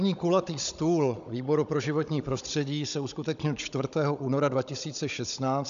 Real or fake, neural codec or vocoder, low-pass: fake; codec, 16 kHz, 16 kbps, FunCodec, trained on Chinese and English, 50 frames a second; 7.2 kHz